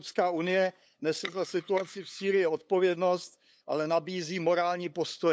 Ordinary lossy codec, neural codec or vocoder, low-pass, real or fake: none; codec, 16 kHz, 8 kbps, FunCodec, trained on LibriTTS, 25 frames a second; none; fake